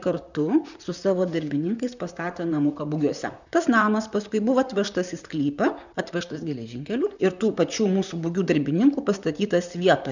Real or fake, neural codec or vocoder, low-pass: fake; vocoder, 44.1 kHz, 128 mel bands, Pupu-Vocoder; 7.2 kHz